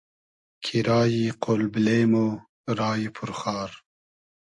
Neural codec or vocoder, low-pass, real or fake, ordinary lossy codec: none; 10.8 kHz; real; AAC, 48 kbps